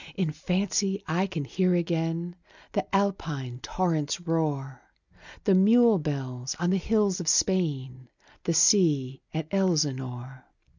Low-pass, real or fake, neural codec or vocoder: 7.2 kHz; real; none